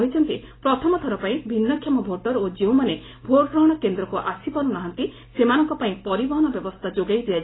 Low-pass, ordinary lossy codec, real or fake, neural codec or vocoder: 7.2 kHz; AAC, 16 kbps; real; none